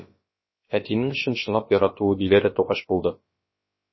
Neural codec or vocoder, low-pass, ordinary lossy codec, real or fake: codec, 16 kHz, about 1 kbps, DyCAST, with the encoder's durations; 7.2 kHz; MP3, 24 kbps; fake